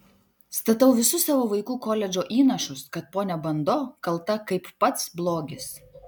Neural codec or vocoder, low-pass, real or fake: none; 19.8 kHz; real